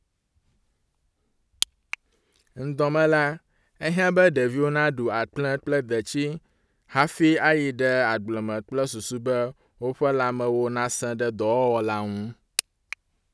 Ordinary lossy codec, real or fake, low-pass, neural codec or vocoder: none; real; none; none